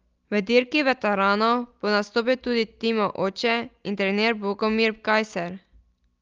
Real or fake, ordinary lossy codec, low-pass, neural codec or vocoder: real; Opus, 32 kbps; 7.2 kHz; none